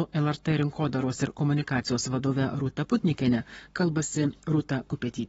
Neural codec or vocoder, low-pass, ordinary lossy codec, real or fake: codec, 44.1 kHz, 7.8 kbps, Pupu-Codec; 19.8 kHz; AAC, 24 kbps; fake